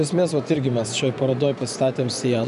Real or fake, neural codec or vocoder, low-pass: fake; vocoder, 24 kHz, 100 mel bands, Vocos; 10.8 kHz